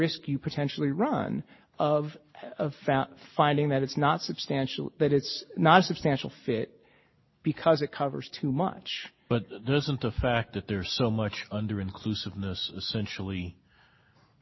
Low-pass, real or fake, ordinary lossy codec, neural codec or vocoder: 7.2 kHz; real; MP3, 24 kbps; none